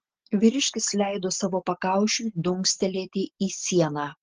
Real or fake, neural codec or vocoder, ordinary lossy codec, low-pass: real; none; Opus, 16 kbps; 7.2 kHz